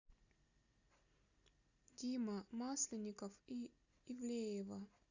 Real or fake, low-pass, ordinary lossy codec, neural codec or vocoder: real; 7.2 kHz; Opus, 64 kbps; none